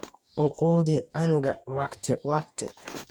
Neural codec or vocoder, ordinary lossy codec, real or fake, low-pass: codec, 44.1 kHz, 2.6 kbps, DAC; MP3, 96 kbps; fake; 19.8 kHz